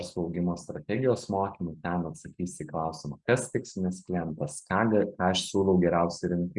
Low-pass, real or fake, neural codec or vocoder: 10.8 kHz; real; none